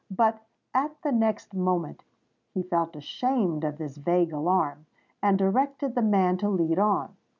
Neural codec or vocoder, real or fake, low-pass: none; real; 7.2 kHz